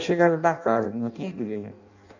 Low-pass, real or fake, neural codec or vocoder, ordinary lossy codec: 7.2 kHz; fake; codec, 16 kHz in and 24 kHz out, 0.6 kbps, FireRedTTS-2 codec; none